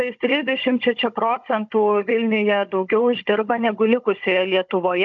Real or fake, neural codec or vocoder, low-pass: fake; codec, 16 kHz, 16 kbps, FunCodec, trained on Chinese and English, 50 frames a second; 7.2 kHz